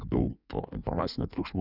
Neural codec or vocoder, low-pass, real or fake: codec, 16 kHz in and 24 kHz out, 1.1 kbps, FireRedTTS-2 codec; 5.4 kHz; fake